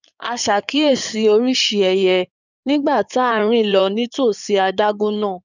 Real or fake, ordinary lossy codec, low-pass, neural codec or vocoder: fake; none; 7.2 kHz; codec, 16 kHz in and 24 kHz out, 2.2 kbps, FireRedTTS-2 codec